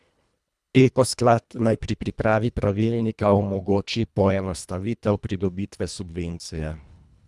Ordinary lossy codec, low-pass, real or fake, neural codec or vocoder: none; none; fake; codec, 24 kHz, 1.5 kbps, HILCodec